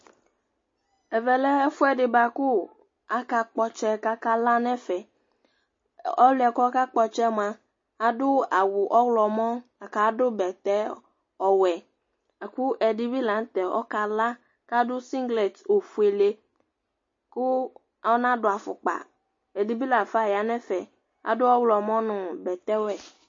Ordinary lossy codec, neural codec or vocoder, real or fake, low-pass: MP3, 32 kbps; none; real; 7.2 kHz